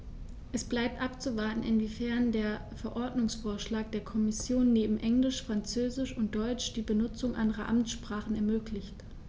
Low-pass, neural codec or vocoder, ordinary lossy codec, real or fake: none; none; none; real